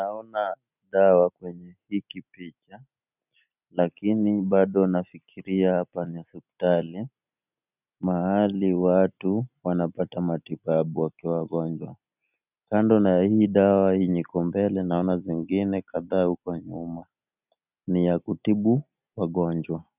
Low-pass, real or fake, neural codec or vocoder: 3.6 kHz; real; none